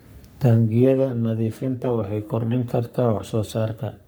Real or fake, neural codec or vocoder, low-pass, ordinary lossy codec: fake; codec, 44.1 kHz, 3.4 kbps, Pupu-Codec; none; none